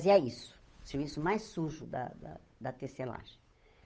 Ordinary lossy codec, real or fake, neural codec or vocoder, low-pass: none; fake; codec, 16 kHz, 8 kbps, FunCodec, trained on Chinese and English, 25 frames a second; none